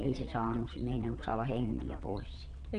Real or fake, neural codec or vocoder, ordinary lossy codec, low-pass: fake; vocoder, 22.05 kHz, 80 mel bands, WaveNeXt; none; 9.9 kHz